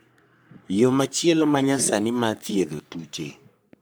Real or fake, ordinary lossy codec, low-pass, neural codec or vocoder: fake; none; none; codec, 44.1 kHz, 3.4 kbps, Pupu-Codec